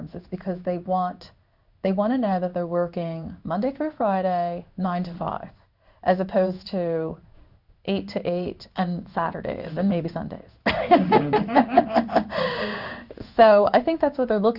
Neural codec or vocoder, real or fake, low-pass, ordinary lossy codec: codec, 16 kHz in and 24 kHz out, 1 kbps, XY-Tokenizer; fake; 5.4 kHz; Opus, 64 kbps